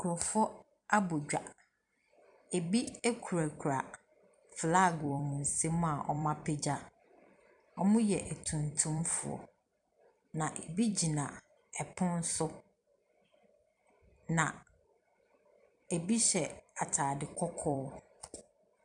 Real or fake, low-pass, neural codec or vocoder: fake; 10.8 kHz; vocoder, 44.1 kHz, 128 mel bands every 256 samples, BigVGAN v2